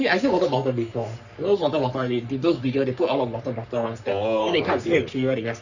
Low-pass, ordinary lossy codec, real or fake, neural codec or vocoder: 7.2 kHz; none; fake; codec, 44.1 kHz, 3.4 kbps, Pupu-Codec